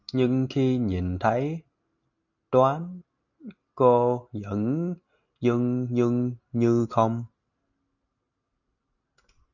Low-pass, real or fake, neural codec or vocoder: 7.2 kHz; real; none